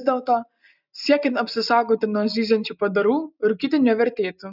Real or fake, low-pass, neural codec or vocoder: real; 5.4 kHz; none